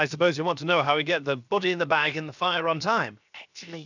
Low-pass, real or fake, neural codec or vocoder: 7.2 kHz; fake; codec, 16 kHz, 0.7 kbps, FocalCodec